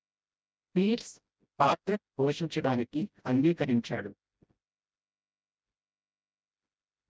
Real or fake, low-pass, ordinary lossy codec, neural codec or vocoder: fake; none; none; codec, 16 kHz, 0.5 kbps, FreqCodec, smaller model